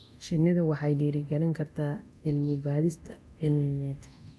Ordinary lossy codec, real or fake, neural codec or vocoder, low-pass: none; fake; codec, 24 kHz, 0.9 kbps, DualCodec; none